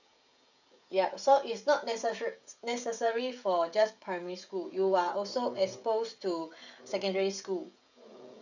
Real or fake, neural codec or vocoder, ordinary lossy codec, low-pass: fake; codec, 16 kHz, 16 kbps, FreqCodec, smaller model; none; 7.2 kHz